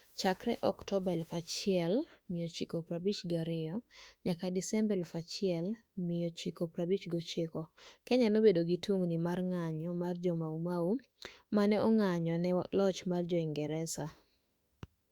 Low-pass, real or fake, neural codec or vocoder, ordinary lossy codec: 19.8 kHz; fake; autoencoder, 48 kHz, 32 numbers a frame, DAC-VAE, trained on Japanese speech; Opus, 64 kbps